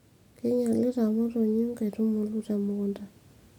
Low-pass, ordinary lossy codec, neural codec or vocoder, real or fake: 19.8 kHz; none; none; real